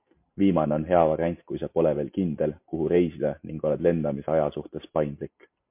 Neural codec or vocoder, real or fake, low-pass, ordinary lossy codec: none; real; 3.6 kHz; MP3, 32 kbps